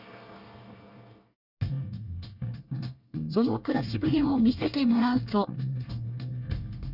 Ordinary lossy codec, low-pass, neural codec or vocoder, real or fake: none; 5.4 kHz; codec, 24 kHz, 1 kbps, SNAC; fake